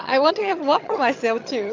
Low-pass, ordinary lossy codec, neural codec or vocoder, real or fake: 7.2 kHz; AAC, 48 kbps; vocoder, 22.05 kHz, 80 mel bands, HiFi-GAN; fake